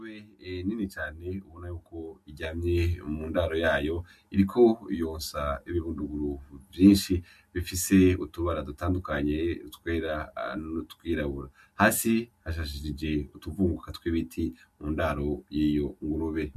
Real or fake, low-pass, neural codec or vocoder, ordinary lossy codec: real; 14.4 kHz; none; MP3, 64 kbps